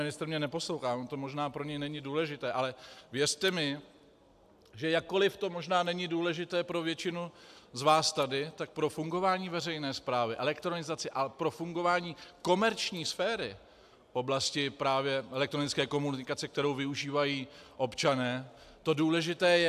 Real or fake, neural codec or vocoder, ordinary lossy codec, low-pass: real; none; AAC, 96 kbps; 14.4 kHz